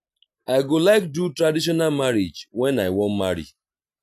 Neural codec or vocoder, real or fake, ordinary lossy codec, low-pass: none; real; none; 14.4 kHz